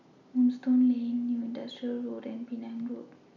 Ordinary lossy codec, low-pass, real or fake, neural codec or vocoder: none; 7.2 kHz; real; none